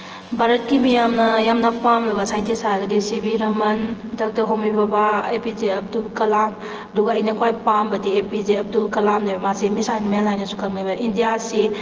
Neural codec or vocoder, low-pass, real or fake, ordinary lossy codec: vocoder, 24 kHz, 100 mel bands, Vocos; 7.2 kHz; fake; Opus, 16 kbps